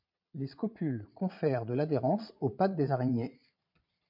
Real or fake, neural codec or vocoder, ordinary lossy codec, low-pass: fake; vocoder, 22.05 kHz, 80 mel bands, Vocos; MP3, 48 kbps; 5.4 kHz